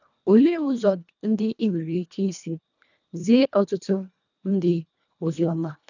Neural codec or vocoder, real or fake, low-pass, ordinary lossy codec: codec, 24 kHz, 1.5 kbps, HILCodec; fake; 7.2 kHz; none